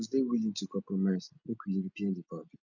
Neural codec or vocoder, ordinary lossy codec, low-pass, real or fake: none; none; 7.2 kHz; real